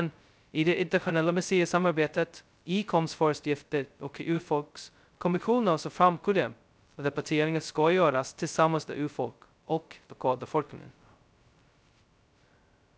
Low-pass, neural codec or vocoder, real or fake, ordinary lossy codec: none; codec, 16 kHz, 0.2 kbps, FocalCodec; fake; none